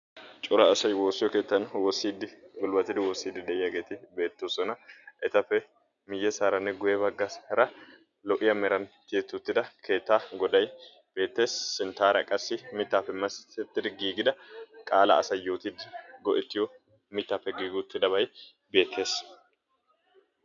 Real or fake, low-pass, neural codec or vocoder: real; 7.2 kHz; none